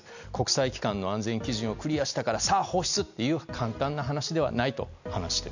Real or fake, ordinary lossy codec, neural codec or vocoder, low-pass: real; none; none; 7.2 kHz